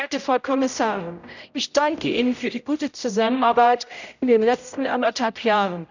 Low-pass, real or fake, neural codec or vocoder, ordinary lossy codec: 7.2 kHz; fake; codec, 16 kHz, 0.5 kbps, X-Codec, HuBERT features, trained on general audio; none